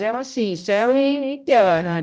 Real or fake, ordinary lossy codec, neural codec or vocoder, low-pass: fake; none; codec, 16 kHz, 0.5 kbps, X-Codec, HuBERT features, trained on general audio; none